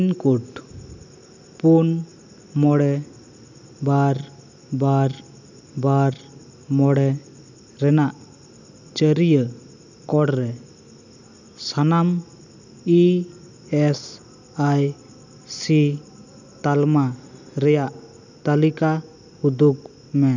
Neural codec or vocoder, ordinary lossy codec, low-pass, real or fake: none; none; 7.2 kHz; real